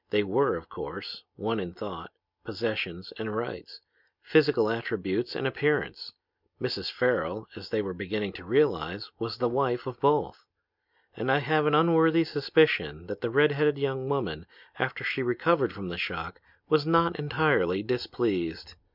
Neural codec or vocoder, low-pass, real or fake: none; 5.4 kHz; real